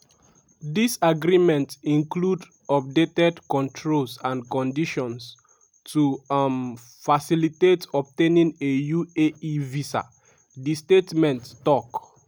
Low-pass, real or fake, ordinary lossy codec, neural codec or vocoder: none; real; none; none